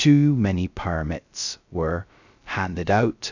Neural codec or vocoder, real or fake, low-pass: codec, 16 kHz, 0.2 kbps, FocalCodec; fake; 7.2 kHz